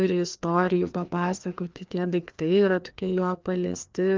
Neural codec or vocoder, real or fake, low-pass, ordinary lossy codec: codec, 16 kHz, 2 kbps, FreqCodec, larger model; fake; 7.2 kHz; Opus, 32 kbps